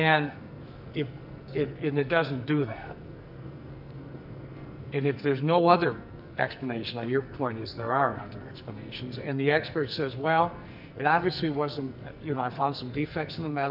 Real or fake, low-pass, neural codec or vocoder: fake; 5.4 kHz; codec, 44.1 kHz, 2.6 kbps, SNAC